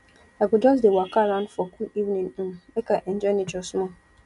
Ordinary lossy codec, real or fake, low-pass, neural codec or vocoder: none; real; 10.8 kHz; none